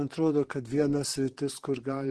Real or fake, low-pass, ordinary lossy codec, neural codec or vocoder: fake; 10.8 kHz; Opus, 16 kbps; vocoder, 44.1 kHz, 128 mel bands, Pupu-Vocoder